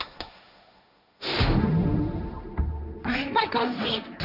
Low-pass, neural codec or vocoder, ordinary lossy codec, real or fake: 5.4 kHz; codec, 16 kHz, 1.1 kbps, Voila-Tokenizer; none; fake